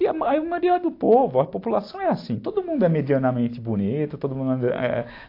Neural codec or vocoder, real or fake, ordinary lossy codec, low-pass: none; real; AAC, 32 kbps; 5.4 kHz